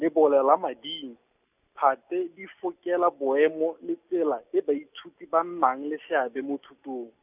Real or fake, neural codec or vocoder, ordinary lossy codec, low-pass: real; none; none; 3.6 kHz